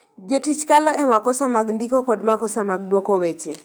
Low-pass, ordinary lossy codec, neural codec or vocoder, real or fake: none; none; codec, 44.1 kHz, 2.6 kbps, SNAC; fake